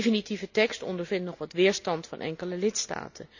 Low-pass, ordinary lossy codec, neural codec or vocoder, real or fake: 7.2 kHz; none; none; real